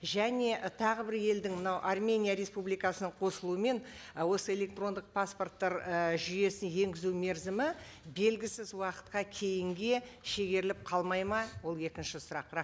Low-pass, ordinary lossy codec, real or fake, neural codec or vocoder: none; none; real; none